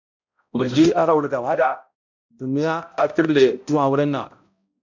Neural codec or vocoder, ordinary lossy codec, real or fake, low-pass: codec, 16 kHz, 0.5 kbps, X-Codec, HuBERT features, trained on balanced general audio; MP3, 48 kbps; fake; 7.2 kHz